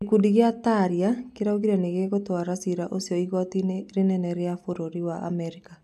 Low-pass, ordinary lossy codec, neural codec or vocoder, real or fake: 14.4 kHz; none; none; real